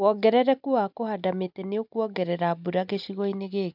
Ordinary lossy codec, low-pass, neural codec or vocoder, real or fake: none; 5.4 kHz; none; real